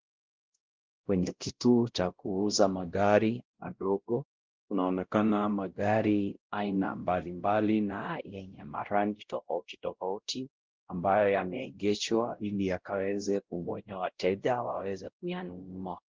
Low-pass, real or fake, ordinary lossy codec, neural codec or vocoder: 7.2 kHz; fake; Opus, 16 kbps; codec, 16 kHz, 0.5 kbps, X-Codec, WavLM features, trained on Multilingual LibriSpeech